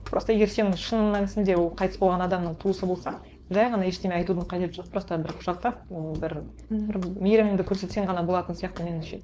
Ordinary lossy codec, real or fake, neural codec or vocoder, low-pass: none; fake; codec, 16 kHz, 4.8 kbps, FACodec; none